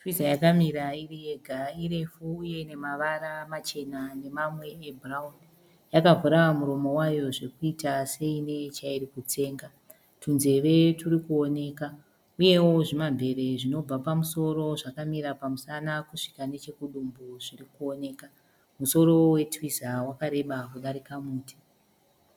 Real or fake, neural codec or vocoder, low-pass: real; none; 19.8 kHz